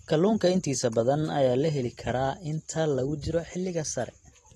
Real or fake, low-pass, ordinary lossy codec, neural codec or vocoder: real; 19.8 kHz; AAC, 32 kbps; none